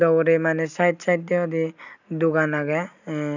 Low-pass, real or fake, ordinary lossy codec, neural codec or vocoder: 7.2 kHz; real; none; none